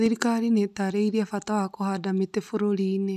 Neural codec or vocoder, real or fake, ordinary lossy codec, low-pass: none; real; none; 14.4 kHz